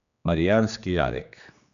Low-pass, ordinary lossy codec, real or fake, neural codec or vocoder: 7.2 kHz; AAC, 96 kbps; fake; codec, 16 kHz, 2 kbps, X-Codec, HuBERT features, trained on general audio